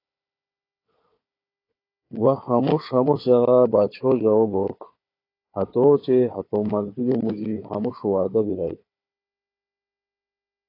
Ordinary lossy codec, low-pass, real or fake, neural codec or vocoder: AAC, 32 kbps; 5.4 kHz; fake; codec, 16 kHz, 4 kbps, FunCodec, trained on Chinese and English, 50 frames a second